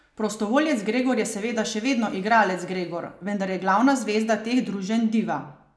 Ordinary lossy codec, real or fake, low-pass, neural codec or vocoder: none; real; none; none